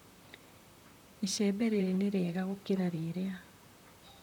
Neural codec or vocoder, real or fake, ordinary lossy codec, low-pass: vocoder, 44.1 kHz, 128 mel bands, Pupu-Vocoder; fake; none; 19.8 kHz